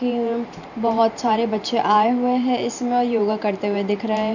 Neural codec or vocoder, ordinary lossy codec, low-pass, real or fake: vocoder, 44.1 kHz, 128 mel bands every 512 samples, BigVGAN v2; none; 7.2 kHz; fake